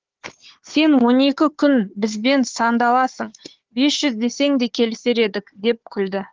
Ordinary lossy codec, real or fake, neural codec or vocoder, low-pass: Opus, 16 kbps; fake; codec, 16 kHz, 4 kbps, FunCodec, trained on Chinese and English, 50 frames a second; 7.2 kHz